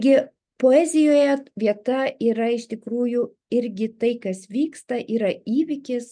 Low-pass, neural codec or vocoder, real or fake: 9.9 kHz; none; real